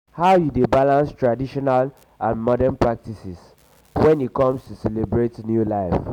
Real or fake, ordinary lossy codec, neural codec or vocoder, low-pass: real; none; none; 19.8 kHz